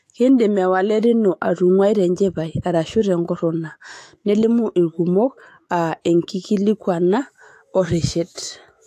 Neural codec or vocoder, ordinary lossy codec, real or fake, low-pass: autoencoder, 48 kHz, 128 numbers a frame, DAC-VAE, trained on Japanese speech; AAC, 64 kbps; fake; 14.4 kHz